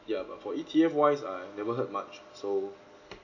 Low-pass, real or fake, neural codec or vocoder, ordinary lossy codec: 7.2 kHz; real; none; none